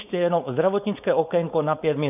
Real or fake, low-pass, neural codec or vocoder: fake; 3.6 kHz; codec, 16 kHz, 4.8 kbps, FACodec